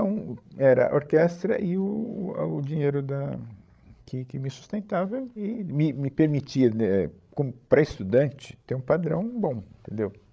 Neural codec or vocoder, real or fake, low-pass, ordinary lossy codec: codec, 16 kHz, 16 kbps, FreqCodec, larger model; fake; none; none